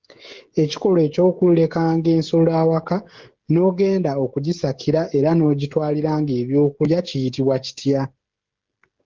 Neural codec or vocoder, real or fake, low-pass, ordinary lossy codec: codec, 16 kHz, 16 kbps, FreqCodec, smaller model; fake; 7.2 kHz; Opus, 16 kbps